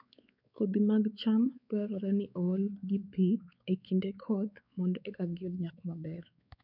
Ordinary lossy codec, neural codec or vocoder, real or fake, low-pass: none; codec, 16 kHz, 4 kbps, X-Codec, WavLM features, trained on Multilingual LibriSpeech; fake; 5.4 kHz